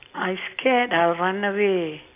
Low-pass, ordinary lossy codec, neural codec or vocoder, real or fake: 3.6 kHz; AAC, 24 kbps; none; real